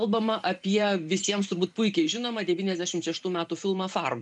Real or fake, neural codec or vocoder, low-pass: fake; vocoder, 44.1 kHz, 128 mel bands every 512 samples, BigVGAN v2; 10.8 kHz